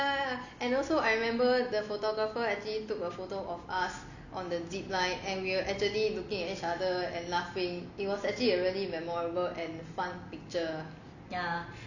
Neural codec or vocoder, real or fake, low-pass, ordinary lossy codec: none; real; 7.2 kHz; none